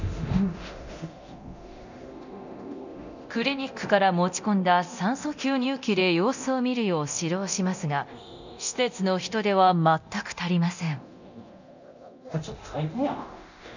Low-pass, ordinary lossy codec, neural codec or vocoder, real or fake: 7.2 kHz; none; codec, 24 kHz, 0.9 kbps, DualCodec; fake